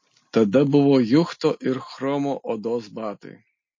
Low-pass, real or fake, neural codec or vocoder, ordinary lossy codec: 7.2 kHz; real; none; MP3, 32 kbps